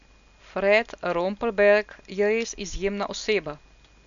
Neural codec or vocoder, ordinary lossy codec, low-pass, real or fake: none; none; 7.2 kHz; real